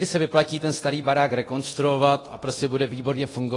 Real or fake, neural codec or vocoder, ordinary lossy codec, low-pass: fake; codec, 24 kHz, 0.9 kbps, DualCodec; AAC, 32 kbps; 10.8 kHz